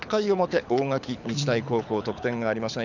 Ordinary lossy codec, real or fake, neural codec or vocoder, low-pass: none; fake; codec, 24 kHz, 6 kbps, HILCodec; 7.2 kHz